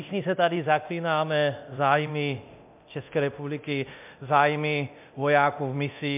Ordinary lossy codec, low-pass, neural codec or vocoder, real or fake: MP3, 32 kbps; 3.6 kHz; codec, 24 kHz, 0.9 kbps, DualCodec; fake